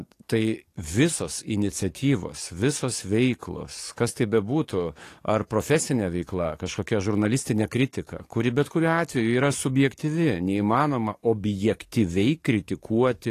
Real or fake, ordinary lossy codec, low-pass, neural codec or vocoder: fake; AAC, 48 kbps; 14.4 kHz; codec, 44.1 kHz, 7.8 kbps, DAC